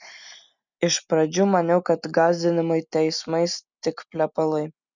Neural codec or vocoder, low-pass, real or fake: none; 7.2 kHz; real